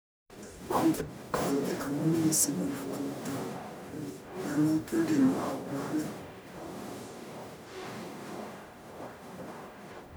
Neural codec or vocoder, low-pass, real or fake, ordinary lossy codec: codec, 44.1 kHz, 0.9 kbps, DAC; none; fake; none